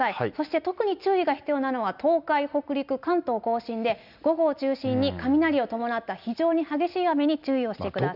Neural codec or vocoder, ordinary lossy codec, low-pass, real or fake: none; none; 5.4 kHz; real